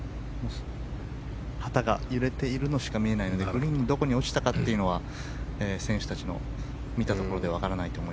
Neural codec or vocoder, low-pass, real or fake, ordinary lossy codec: none; none; real; none